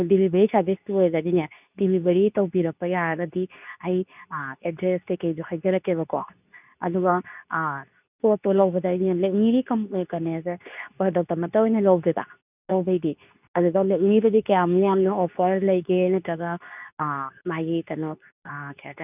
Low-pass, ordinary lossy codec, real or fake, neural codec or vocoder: 3.6 kHz; none; fake; codec, 24 kHz, 0.9 kbps, WavTokenizer, medium speech release version 2